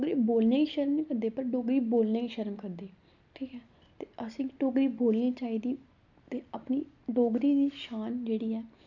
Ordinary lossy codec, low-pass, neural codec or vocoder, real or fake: none; 7.2 kHz; none; real